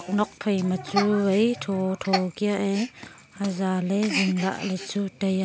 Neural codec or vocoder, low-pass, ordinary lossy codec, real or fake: none; none; none; real